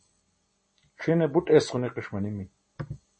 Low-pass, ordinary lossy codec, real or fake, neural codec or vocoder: 10.8 kHz; MP3, 32 kbps; real; none